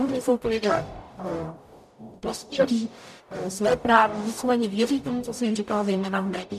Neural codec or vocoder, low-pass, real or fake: codec, 44.1 kHz, 0.9 kbps, DAC; 14.4 kHz; fake